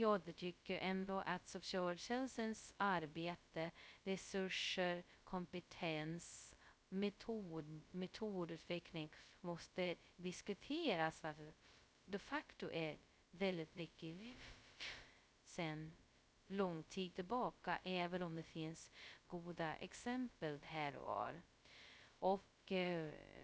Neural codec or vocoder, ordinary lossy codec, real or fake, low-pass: codec, 16 kHz, 0.2 kbps, FocalCodec; none; fake; none